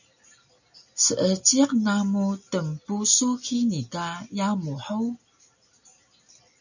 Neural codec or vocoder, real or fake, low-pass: none; real; 7.2 kHz